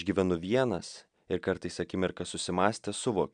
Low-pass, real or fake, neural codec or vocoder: 9.9 kHz; real; none